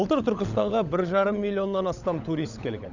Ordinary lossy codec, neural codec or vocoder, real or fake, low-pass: none; codec, 16 kHz, 16 kbps, FunCodec, trained on LibriTTS, 50 frames a second; fake; 7.2 kHz